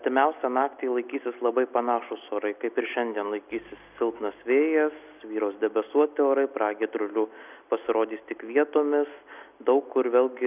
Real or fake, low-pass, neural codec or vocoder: real; 3.6 kHz; none